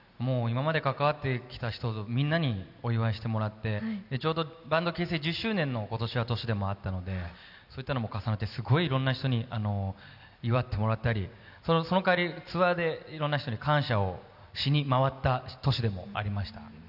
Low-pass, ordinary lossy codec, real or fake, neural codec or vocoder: 5.4 kHz; none; real; none